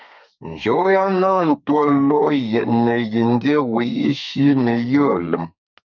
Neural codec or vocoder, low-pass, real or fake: codec, 32 kHz, 1.9 kbps, SNAC; 7.2 kHz; fake